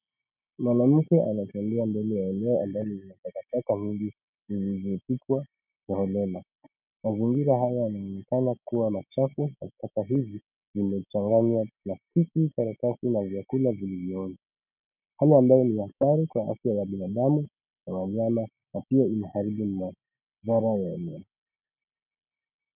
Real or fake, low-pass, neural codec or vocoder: real; 3.6 kHz; none